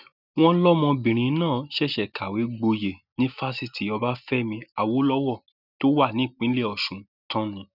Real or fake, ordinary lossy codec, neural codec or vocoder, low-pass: real; none; none; 5.4 kHz